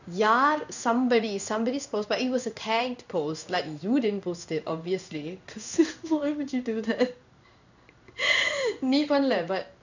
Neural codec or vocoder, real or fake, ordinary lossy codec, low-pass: codec, 16 kHz in and 24 kHz out, 1 kbps, XY-Tokenizer; fake; none; 7.2 kHz